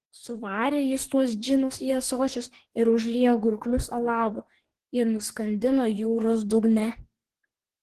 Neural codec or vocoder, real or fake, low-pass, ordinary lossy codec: codec, 44.1 kHz, 2.6 kbps, DAC; fake; 14.4 kHz; Opus, 16 kbps